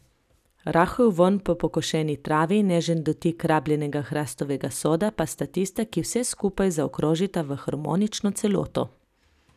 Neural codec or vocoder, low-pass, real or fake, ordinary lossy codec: none; 14.4 kHz; real; none